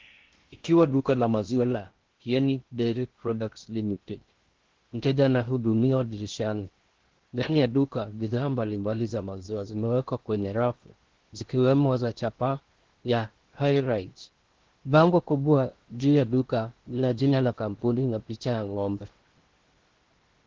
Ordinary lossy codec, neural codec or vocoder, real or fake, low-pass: Opus, 16 kbps; codec, 16 kHz in and 24 kHz out, 0.6 kbps, FocalCodec, streaming, 2048 codes; fake; 7.2 kHz